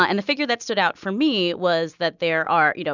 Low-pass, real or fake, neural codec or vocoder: 7.2 kHz; real; none